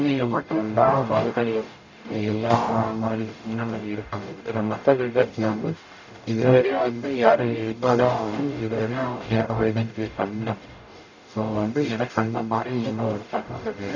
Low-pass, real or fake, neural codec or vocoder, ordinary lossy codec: 7.2 kHz; fake; codec, 44.1 kHz, 0.9 kbps, DAC; none